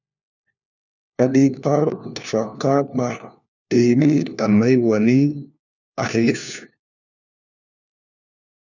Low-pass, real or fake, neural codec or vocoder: 7.2 kHz; fake; codec, 16 kHz, 1 kbps, FunCodec, trained on LibriTTS, 50 frames a second